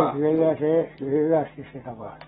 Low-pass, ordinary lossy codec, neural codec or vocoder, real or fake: 14.4 kHz; AAC, 16 kbps; none; real